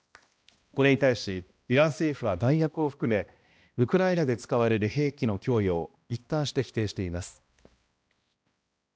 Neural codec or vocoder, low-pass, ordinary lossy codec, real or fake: codec, 16 kHz, 1 kbps, X-Codec, HuBERT features, trained on balanced general audio; none; none; fake